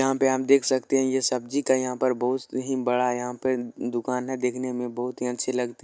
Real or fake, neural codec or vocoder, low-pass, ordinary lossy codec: real; none; none; none